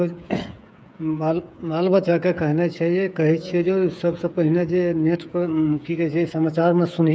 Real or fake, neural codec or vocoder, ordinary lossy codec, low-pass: fake; codec, 16 kHz, 8 kbps, FreqCodec, smaller model; none; none